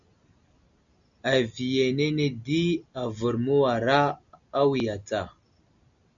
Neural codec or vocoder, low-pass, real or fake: none; 7.2 kHz; real